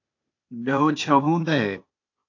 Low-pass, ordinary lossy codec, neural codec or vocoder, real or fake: 7.2 kHz; AAC, 48 kbps; codec, 16 kHz, 0.8 kbps, ZipCodec; fake